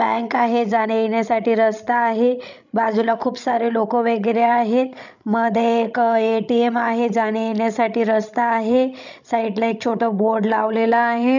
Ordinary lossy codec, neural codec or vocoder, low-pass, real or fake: none; codec, 16 kHz, 16 kbps, FreqCodec, larger model; 7.2 kHz; fake